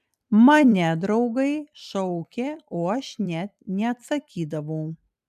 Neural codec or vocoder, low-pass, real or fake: vocoder, 44.1 kHz, 128 mel bands every 256 samples, BigVGAN v2; 14.4 kHz; fake